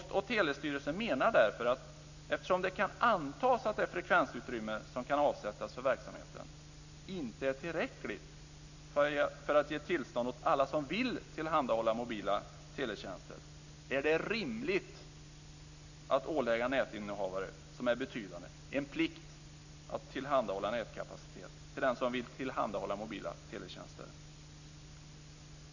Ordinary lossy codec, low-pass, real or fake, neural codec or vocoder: none; 7.2 kHz; real; none